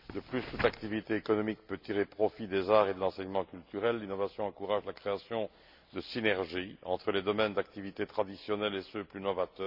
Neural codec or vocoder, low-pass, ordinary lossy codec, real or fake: none; 5.4 kHz; MP3, 48 kbps; real